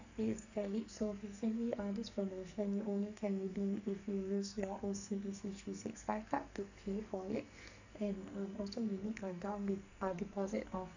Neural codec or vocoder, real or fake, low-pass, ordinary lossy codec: codec, 44.1 kHz, 2.6 kbps, SNAC; fake; 7.2 kHz; none